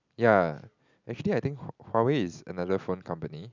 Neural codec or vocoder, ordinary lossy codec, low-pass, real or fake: none; none; 7.2 kHz; real